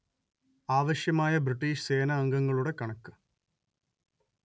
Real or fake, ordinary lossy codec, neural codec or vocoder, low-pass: real; none; none; none